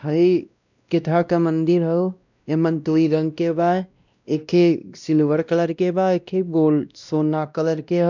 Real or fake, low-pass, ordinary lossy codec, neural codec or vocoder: fake; 7.2 kHz; none; codec, 16 kHz, 1 kbps, X-Codec, WavLM features, trained on Multilingual LibriSpeech